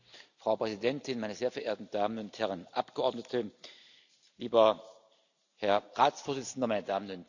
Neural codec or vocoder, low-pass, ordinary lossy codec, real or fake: none; 7.2 kHz; none; real